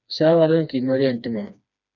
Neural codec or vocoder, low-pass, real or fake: codec, 16 kHz, 2 kbps, FreqCodec, smaller model; 7.2 kHz; fake